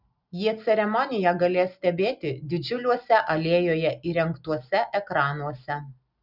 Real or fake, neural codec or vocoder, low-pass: real; none; 5.4 kHz